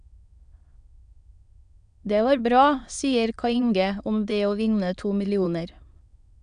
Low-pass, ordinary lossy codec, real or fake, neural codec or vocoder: 9.9 kHz; none; fake; autoencoder, 22.05 kHz, a latent of 192 numbers a frame, VITS, trained on many speakers